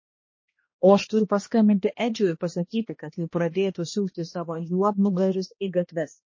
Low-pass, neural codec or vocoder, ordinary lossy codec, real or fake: 7.2 kHz; codec, 16 kHz, 1 kbps, X-Codec, HuBERT features, trained on balanced general audio; MP3, 32 kbps; fake